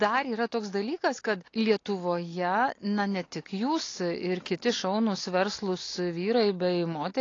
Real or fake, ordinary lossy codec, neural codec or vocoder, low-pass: real; AAC, 32 kbps; none; 7.2 kHz